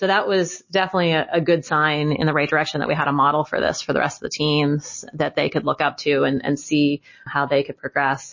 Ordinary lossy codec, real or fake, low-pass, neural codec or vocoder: MP3, 32 kbps; real; 7.2 kHz; none